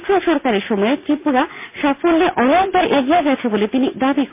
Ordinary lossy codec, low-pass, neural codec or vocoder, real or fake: MP3, 32 kbps; 3.6 kHz; none; real